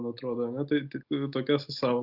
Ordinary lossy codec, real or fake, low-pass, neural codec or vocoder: Opus, 64 kbps; real; 5.4 kHz; none